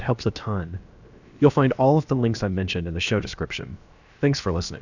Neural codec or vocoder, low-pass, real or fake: codec, 16 kHz, about 1 kbps, DyCAST, with the encoder's durations; 7.2 kHz; fake